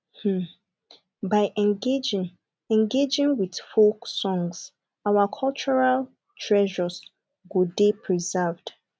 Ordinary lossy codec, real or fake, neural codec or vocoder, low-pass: none; real; none; none